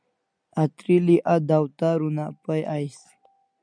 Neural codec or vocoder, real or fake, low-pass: none; real; 9.9 kHz